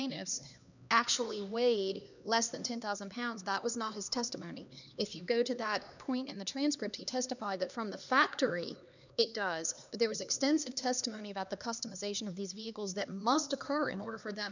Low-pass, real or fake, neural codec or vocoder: 7.2 kHz; fake; codec, 16 kHz, 2 kbps, X-Codec, HuBERT features, trained on LibriSpeech